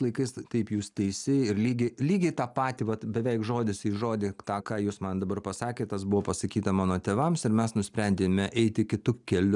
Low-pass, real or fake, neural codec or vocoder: 10.8 kHz; real; none